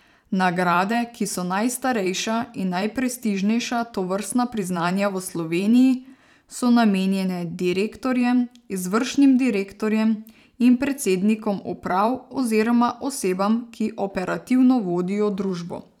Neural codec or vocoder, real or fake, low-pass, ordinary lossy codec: vocoder, 44.1 kHz, 128 mel bands every 512 samples, BigVGAN v2; fake; 19.8 kHz; none